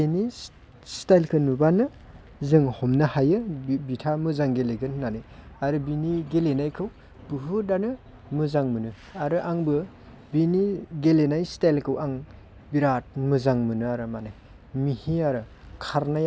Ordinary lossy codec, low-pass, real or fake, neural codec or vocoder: none; none; real; none